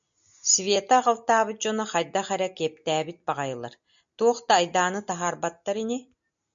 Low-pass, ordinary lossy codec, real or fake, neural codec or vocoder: 7.2 kHz; MP3, 64 kbps; real; none